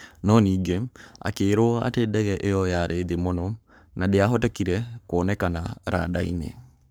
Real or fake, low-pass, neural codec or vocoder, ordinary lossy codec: fake; none; codec, 44.1 kHz, 7.8 kbps, Pupu-Codec; none